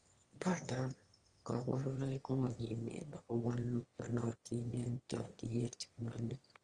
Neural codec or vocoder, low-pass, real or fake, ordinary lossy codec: autoencoder, 22.05 kHz, a latent of 192 numbers a frame, VITS, trained on one speaker; 9.9 kHz; fake; Opus, 24 kbps